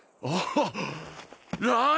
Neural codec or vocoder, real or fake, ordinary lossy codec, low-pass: none; real; none; none